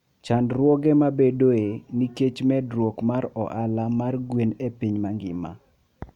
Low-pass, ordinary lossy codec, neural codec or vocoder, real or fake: 19.8 kHz; none; none; real